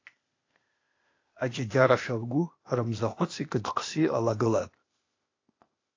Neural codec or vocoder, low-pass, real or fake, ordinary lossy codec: codec, 16 kHz, 0.8 kbps, ZipCodec; 7.2 kHz; fake; AAC, 32 kbps